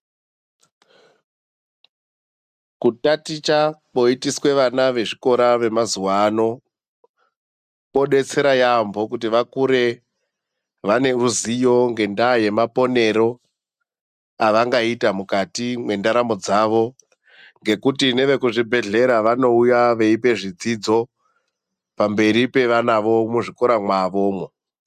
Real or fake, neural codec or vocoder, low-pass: real; none; 14.4 kHz